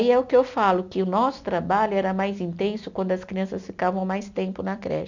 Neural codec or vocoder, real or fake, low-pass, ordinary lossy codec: none; real; 7.2 kHz; none